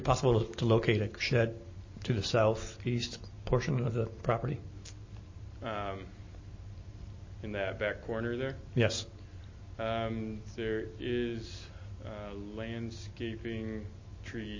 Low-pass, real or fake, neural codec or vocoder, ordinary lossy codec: 7.2 kHz; real; none; MP3, 32 kbps